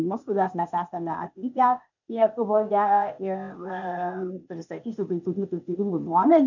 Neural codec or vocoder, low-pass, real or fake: codec, 16 kHz, 0.8 kbps, ZipCodec; 7.2 kHz; fake